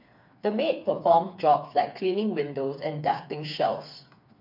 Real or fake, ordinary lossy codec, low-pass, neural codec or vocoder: fake; MP3, 48 kbps; 5.4 kHz; codec, 16 kHz, 4 kbps, FreqCodec, smaller model